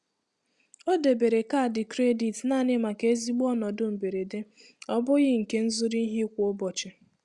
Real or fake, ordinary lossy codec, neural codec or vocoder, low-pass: real; Opus, 64 kbps; none; 10.8 kHz